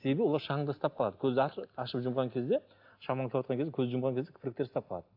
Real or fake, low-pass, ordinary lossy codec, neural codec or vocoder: real; 5.4 kHz; AAC, 48 kbps; none